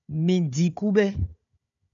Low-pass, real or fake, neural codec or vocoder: 7.2 kHz; fake; codec, 16 kHz, 4 kbps, FunCodec, trained on Chinese and English, 50 frames a second